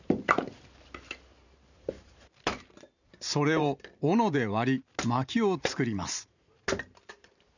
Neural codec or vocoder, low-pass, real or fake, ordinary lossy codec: vocoder, 44.1 kHz, 128 mel bands every 512 samples, BigVGAN v2; 7.2 kHz; fake; none